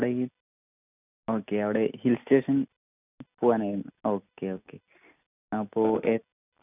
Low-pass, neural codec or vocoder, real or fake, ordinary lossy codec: 3.6 kHz; none; real; none